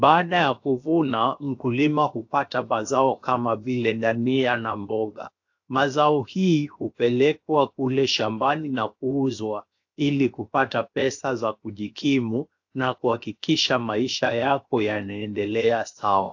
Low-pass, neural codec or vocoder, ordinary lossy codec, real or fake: 7.2 kHz; codec, 16 kHz, 0.7 kbps, FocalCodec; AAC, 48 kbps; fake